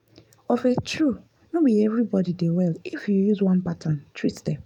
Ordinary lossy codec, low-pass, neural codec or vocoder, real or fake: none; 19.8 kHz; codec, 44.1 kHz, 7.8 kbps, DAC; fake